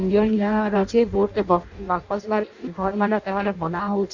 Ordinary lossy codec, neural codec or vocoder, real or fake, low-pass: Opus, 64 kbps; codec, 16 kHz in and 24 kHz out, 0.6 kbps, FireRedTTS-2 codec; fake; 7.2 kHz